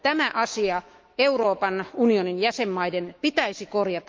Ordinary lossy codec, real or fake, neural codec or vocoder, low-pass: Opus, 32 kbps; fake; autoencoder, 48 kHz, 128 numbers a frame, DAC-VAE, trained on Japanese speech; 7.2 kHz